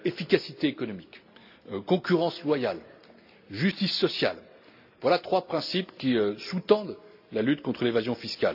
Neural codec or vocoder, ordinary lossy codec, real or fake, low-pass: none; AAC, 48 kbps; real; 5.4 kHz